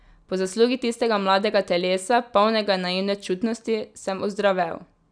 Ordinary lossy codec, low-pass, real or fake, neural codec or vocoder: none; 9.9 kHz; real; none